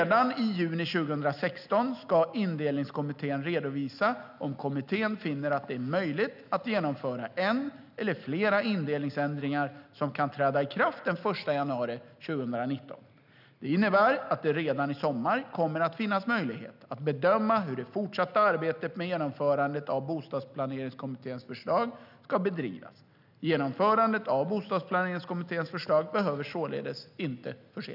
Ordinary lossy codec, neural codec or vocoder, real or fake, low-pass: none; none; real; 5.4 kHz